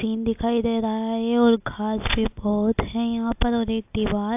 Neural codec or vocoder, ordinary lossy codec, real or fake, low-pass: none; AAC, 32 kbps; real; 3.6 kHz